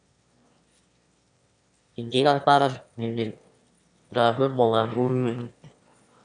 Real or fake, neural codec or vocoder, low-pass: fake; autoencoder, 22.05 kHz, a latent of 192 numbers a frame, VITS, trained on one speaker; 9.9 kHz